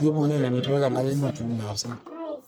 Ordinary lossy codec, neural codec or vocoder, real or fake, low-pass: none; codec, 44.1 kHz, 1.7 kbps, Pupu-Codec; fake; none